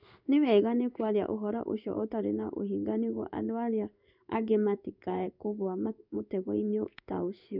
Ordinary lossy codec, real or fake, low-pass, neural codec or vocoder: none; fake; 5.4 kHz; codec, 16 kHz in and 24 kHz out, 1 kbps, XY-Tokenizer